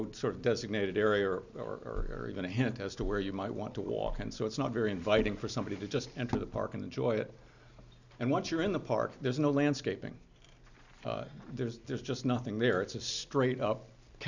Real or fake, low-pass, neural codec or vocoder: real; 7.2 kHz; none